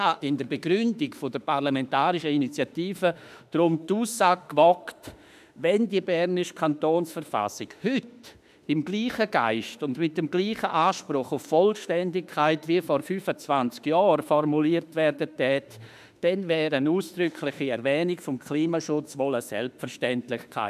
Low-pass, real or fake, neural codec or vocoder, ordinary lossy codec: 14.4 kHz; fake; autoencoder, 48 kHz, 32 numbers a frame, DAC-VAE, trained on Japanese speech; none